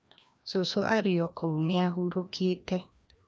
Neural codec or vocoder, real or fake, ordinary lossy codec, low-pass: codec, 16 kHz, 1 kbps, FreqCodec, larger model; fake; none; none